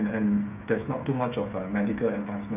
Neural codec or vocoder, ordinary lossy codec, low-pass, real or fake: codec, 16 kHz, 8 kbps, FreqCodec, smaller model; none; 3.6 kHz; fake